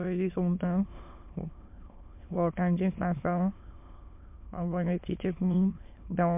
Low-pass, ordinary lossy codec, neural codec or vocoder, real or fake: 3.6 kHz; MP3, 32 kbps; autoencoder, 22.05 kHz, a latent of 192 numbers a frame, VITS, trained on many speakers; fake